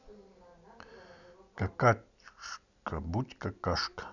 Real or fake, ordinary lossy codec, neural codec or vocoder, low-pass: real; none; none; 7.2 kHz